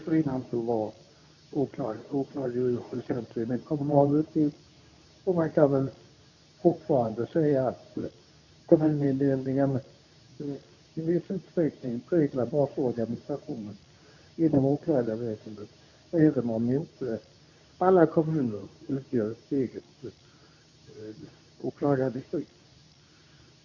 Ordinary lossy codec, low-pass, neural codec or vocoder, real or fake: none; 7.2 kHz; codec, 24 kHz, 0.9 kbps, WavTokenizer, medium speech release version 2; fake